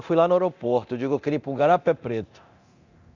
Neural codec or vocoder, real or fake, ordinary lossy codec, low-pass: codec, 24 kHz, 0.9 kbps, DualCodec; fake; Opus, 64 kbps; 7.2 kHz